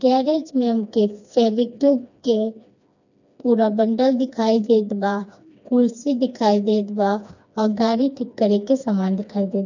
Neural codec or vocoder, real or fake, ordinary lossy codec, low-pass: codec, 16 kHz, 2 kbps, FreqCodec, smaller model; fake; none; 7.2 kHz